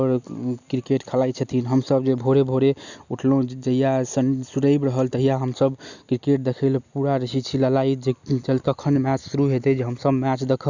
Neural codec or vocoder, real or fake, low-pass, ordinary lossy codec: none; real; 7.2 kHz; none